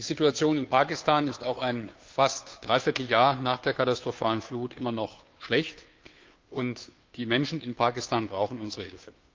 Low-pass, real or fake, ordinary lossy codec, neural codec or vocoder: 7.2 kHz; fake; Opus, 24 kbps; codec, 16 kHz, 2 kbps, FunCodec, trained on Chinese and English, 25 frames a second